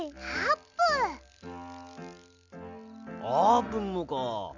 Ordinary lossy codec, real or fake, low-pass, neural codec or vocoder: none; real; 7.2 kHz; none